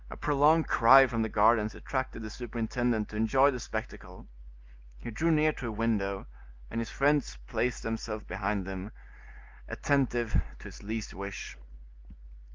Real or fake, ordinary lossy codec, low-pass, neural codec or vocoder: real; Opus, 32 kbps; 7.2 kHz; none